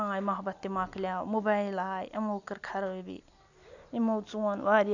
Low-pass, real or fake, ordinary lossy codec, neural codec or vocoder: 7.2 kHz; real; none; none